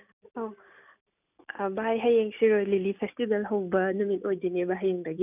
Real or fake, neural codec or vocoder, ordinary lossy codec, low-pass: real; none; none; 3.6 kHz